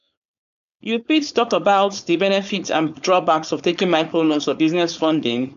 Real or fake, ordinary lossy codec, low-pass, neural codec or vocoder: fake; none; 7.2 kHz; codec, 16 kHz, 4.8 kbps, FACodec